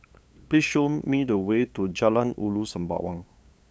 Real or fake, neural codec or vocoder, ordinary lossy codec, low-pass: fake; codec, 16 kHz, 8 kbps, FunCodec, trained on LibriTTS, 25 frames a second; none; none